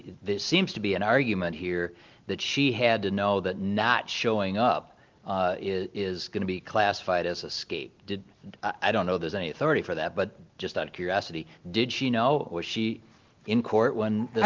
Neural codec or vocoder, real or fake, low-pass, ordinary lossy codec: none; real; 7.2 kHz; Opus, 32 kbps